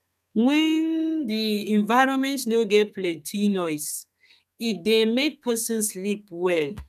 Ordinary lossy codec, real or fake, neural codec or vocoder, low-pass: none; fake; codec, 32 kHz, 1.9 kbps, SNAC; 14.4 kHz